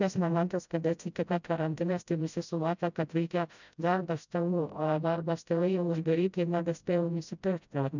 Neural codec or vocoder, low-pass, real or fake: codec, 16 kHz, 0.5 kbps, FreqCodec, smaller model; 7.2 kHz; fake